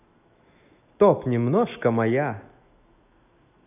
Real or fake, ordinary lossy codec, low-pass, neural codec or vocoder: fake; AAC, 32 kbps; 3.6 kHz; autoencoder, 48 kHz, 128 numbers a frame, DAC-VAE, trained on Japanese speech